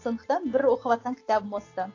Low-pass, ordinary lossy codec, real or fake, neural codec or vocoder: 7.2 kHz; AAC, 32 kbps; real; none